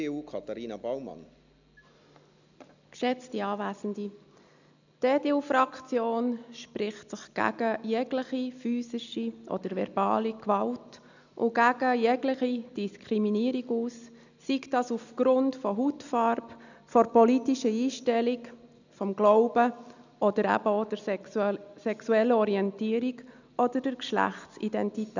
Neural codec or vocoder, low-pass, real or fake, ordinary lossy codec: none; 7.2 kHz; real; none